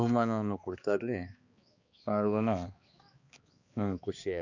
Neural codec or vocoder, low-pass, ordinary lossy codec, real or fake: codec, 16 kHz, 2 kbps, X-Codec, HuBERT features, trained on balanced general audio; 7.2 kHz; Opus, 64 kbps; fake